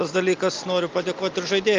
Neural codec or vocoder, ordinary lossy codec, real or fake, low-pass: none; Opus, 24 kbps; real; 7.2 kHz